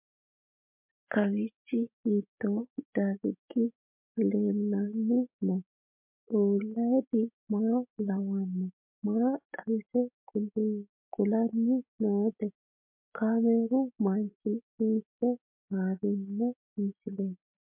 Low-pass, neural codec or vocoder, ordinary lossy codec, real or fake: 3.6 kHz; none; MP3, 32 kbps; real